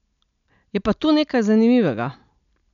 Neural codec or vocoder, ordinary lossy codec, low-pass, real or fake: none; none; 7.2 kHz; real